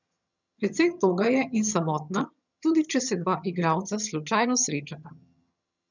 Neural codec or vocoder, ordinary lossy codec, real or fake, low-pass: vocoder, 22.05 kHz, 80 mel bands, HiFi-GAN; none; fake; 7.2 kHz